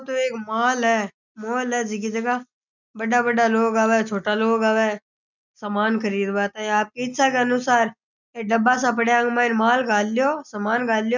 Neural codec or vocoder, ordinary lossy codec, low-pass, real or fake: none; none; 7.2 kHz; real